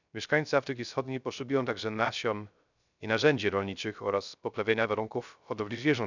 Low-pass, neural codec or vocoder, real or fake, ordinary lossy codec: 7.2 kHz; codec, 16 kHz, 0.3 kbps, FocalCodec; fake; none